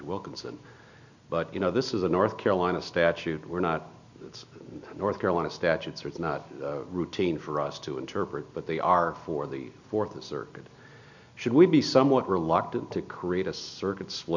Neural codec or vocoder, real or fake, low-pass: none; real; 7.2 kHz